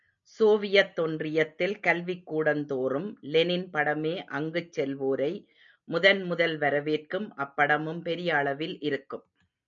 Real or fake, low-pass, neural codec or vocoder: real; 7.2 kHz; none